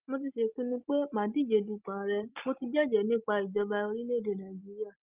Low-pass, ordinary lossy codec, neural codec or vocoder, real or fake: 3.6 kHz; Opus, 24 kbps; none; real